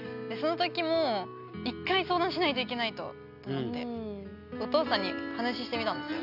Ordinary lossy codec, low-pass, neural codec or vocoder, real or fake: none; 5.4 kHz; none; real